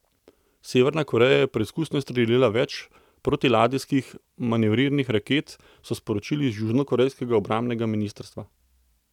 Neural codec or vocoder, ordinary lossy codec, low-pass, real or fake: none; none; 19.8 kHz; real